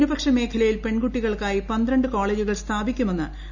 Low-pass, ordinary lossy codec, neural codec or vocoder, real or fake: 7.2 kHz; none; none; real